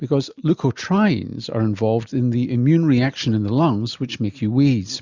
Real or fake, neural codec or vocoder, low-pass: real; none; 7.2 kHz